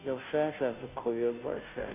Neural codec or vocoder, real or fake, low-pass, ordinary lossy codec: codec, 16 kHz, 0.5 kbps, FunCodec, trained on Chinese and English, 25 frames a second; fake; 3.6 kHz; none